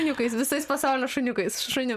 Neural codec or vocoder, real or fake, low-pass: none; real; 14.4 kHz